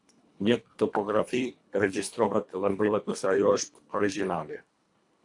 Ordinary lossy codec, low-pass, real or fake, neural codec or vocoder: AAC, 64 kbps; 10.8 kHz; fake; codec, 24 kHz, 1.5 kbps, HILCodec